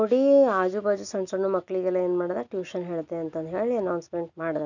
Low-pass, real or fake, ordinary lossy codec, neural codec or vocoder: 7.2 kHz; real; none; none